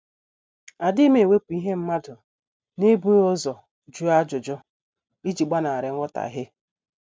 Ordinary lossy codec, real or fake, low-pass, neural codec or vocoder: none; real; none; none